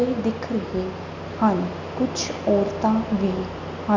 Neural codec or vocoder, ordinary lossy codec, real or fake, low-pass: none; none; real; 7.2 kHz